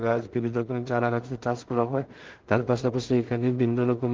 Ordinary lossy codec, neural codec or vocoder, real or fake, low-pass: Opus, 16 kbps; codec, 16 kHz in and 24 kHz out, 0.4 kbps, LongCat-Audio-Codec, two codebook decoder; fake; 7.2 kHz